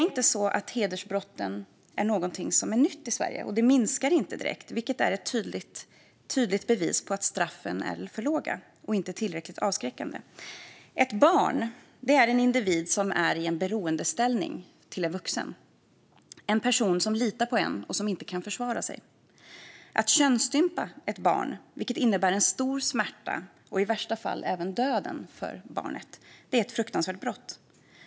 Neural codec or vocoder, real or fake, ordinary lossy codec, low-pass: none; real; none; none